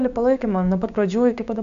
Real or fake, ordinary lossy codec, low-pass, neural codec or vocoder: fake; AAC, 96 kbps; 7.2 kHz; codec, 16 kHz, 6 kbps, DAC